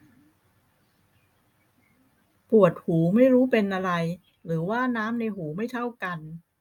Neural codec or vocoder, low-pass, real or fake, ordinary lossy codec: none; 19.8 kHz; real; none